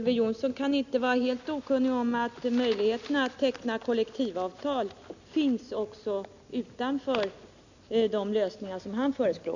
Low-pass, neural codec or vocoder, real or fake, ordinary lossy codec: 7.2 kHz; none; real; none